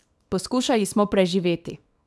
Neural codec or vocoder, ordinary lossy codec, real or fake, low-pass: codec, 24 kHz, 0.9 kbps, WavTokenizer, small release; none; fake; none